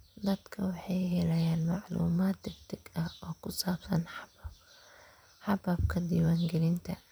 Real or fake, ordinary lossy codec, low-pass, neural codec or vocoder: fake; none; none; vocoder, 44.1 kHz, 128 mel bands every 512 samples, BigVGAN v2